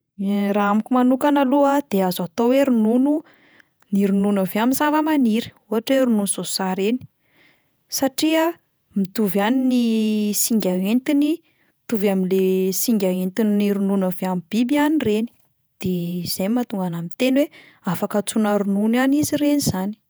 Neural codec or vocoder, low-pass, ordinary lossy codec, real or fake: vocoder, 48 kHz, 128 mel bands, Vocos; none; none; fake